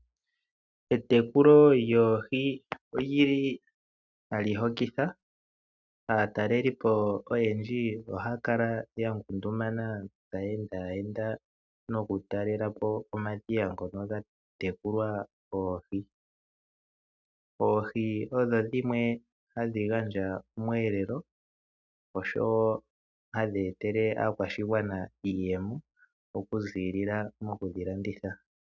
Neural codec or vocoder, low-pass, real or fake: none; 7.2 kHz; real